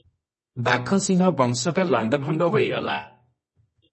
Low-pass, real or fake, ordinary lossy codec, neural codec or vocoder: 10.8 kHz; fake; MP3, 32 kbps; codec, 24 kHz, 0.9 kbps, WavTokenizer, medium music audio release